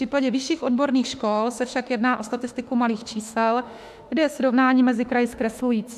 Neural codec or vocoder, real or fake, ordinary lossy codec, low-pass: autoencoder, 48 kHz, 32 numbers a frame, DAC-VAE, trained on Japanese speech; fake; AAC, 96 kbps; 14.4 kHz